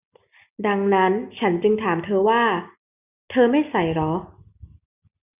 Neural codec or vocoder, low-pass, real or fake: none; 3.6 kHz; real